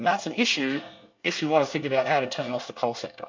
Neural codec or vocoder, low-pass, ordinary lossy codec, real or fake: codec, 24 kHz, 1 kbps, SNAC; 7.2 kHz; MP3, 48 kbps; fake